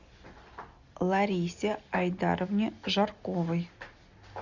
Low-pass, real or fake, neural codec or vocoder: 7.2 kHz; real; none